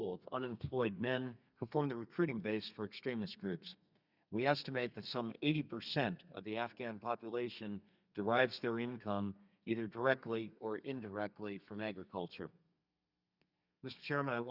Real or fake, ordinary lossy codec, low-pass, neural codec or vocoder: fake; Opus, 64 kbps; 5.4 kHz; codec, 32 kHz, 1.9 kbps, SNAC